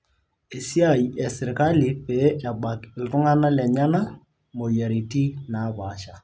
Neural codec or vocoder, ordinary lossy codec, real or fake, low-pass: none; none; real; none